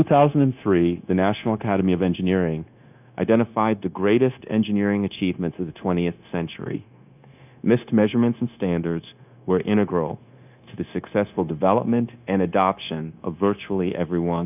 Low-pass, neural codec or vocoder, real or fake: 3.6 kHz; codec, 16 kHz, 0.9 kbps, LongCat-Audio-Codec; fake